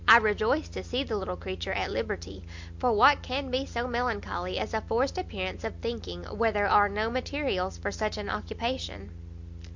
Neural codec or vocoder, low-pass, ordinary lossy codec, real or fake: none; 7.2 kHz; MP3, 64 kbps; real